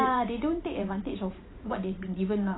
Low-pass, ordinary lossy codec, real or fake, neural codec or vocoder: 7.2 kHz; AAC, 16 kbps; fake; vocoder, 44.1 kHz, 128 mel bands every 512 samples, BigVGAN v2